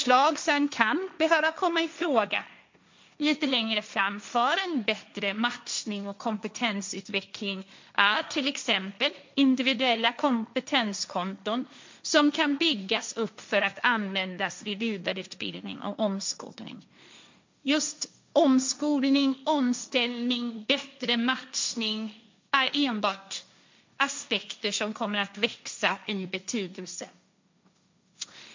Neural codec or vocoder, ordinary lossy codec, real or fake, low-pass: codec, 16 kHz, 1.1 kbps, Voila-Tokenizer; MP3, 64 kbps; fake; 7.2 kHz